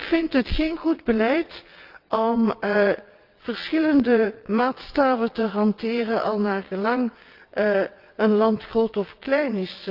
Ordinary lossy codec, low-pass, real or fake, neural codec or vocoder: Opus, 24 kbps; 5.4 kHz; fake; vocoder, 22.05 kHz, 80 mel bands, WaveNeXt